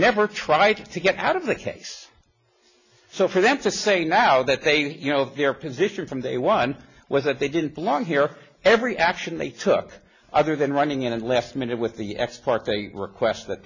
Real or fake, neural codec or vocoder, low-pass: real; none; 7.2 kHz